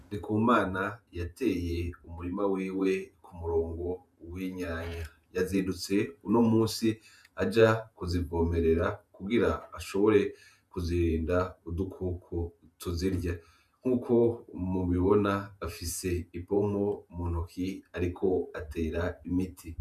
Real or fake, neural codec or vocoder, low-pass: fake; vocoder, 48 kHz, 128 mel bands, Vocos; 14.4 kHz